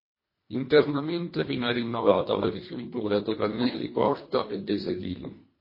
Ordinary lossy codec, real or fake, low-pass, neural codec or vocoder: MP3, 24 kbps; fake; 5.4 kHz; codec, 24 kHz, 1.5 kbps, HILCodec